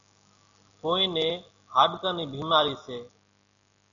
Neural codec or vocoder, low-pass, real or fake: none; 7.2 kHz; real